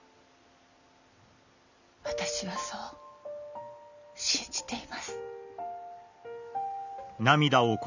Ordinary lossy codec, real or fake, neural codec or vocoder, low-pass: none; real; none; 7.2 kHz